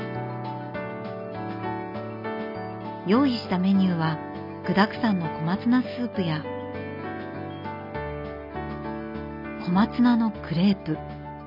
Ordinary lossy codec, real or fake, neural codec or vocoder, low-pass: none; real; none; 5.4 kHz